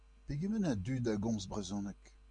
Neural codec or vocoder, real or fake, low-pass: none; real; 9.9 kHz